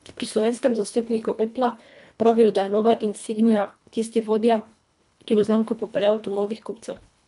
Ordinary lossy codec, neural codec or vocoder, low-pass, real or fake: none; codec, 24 kHz, 1.5 kbps, HILCodec; 10.8 kHz; fake